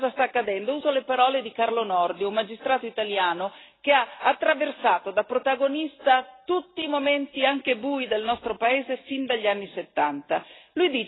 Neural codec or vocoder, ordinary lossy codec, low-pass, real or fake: none; AAC, 16 kbps; 7.2 kHz; real